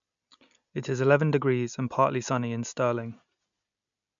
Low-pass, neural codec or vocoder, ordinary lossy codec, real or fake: 7.2 kHz; none; none; real